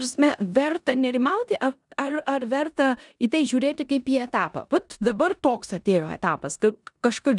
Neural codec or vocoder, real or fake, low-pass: codec, 16 kHz in and 24 kHz out, 0.9 kbps, LongCat-Audio-Codec, four codebook decoder; fake; 10.8 kHz